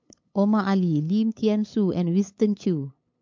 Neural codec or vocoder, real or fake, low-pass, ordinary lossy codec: codec, 16 kHz, 8 kbps, FunCodec, trained on LibriTTS, 25 frames a second; fake; 7.2 kHz; MP3, 48 kbps